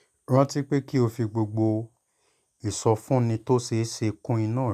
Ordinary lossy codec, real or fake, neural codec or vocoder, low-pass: none; real; none; 14.4 kHz